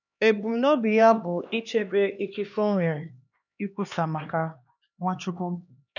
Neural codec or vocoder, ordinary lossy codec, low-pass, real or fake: codec, 16 kHz, 2 kbps, X-Codec, HuBERT features, trained on LibriSpeech; none; 7.2 kHz; fake